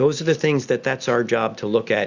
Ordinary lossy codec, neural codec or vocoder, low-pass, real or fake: Opus, 64 kbps; none; 7.2 kHz; real